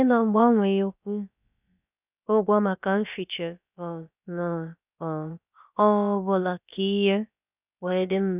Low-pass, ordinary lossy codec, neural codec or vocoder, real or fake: 3.6 kHz; none; codec, 16 kHz, about 1 kbps, DyCAST, with the encoder's durations; fake